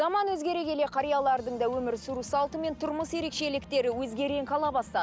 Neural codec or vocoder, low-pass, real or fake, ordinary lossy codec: none; none; real; none